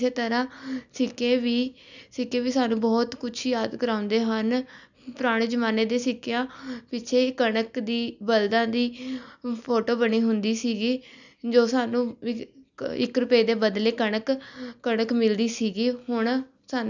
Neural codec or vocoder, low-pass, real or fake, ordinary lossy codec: none; 7.2 kHz; real; none